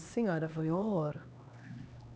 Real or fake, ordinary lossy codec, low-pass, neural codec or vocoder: fake; none; none; codec, 16 kHz, 2 kbps, X-Codec, HuBERT features, trained on LibriSpeech